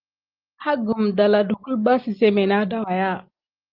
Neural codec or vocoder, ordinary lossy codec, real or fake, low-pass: none; Opus, 32 kbps; real; 5.4 kHz